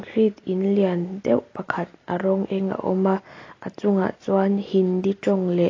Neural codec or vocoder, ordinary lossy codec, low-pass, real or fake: none; AAC, 32 kbps; 7.2 kHz; real